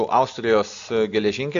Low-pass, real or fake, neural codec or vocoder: 7.2 kHz; real; none